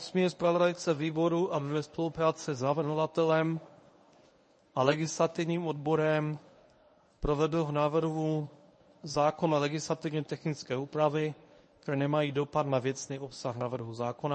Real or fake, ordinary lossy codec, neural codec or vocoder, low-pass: fake; MP3, 32 kbps; codec, 24 kHz, 0.9 kbps, WavTokenizer, medium speech release version 1; 10.8 kHz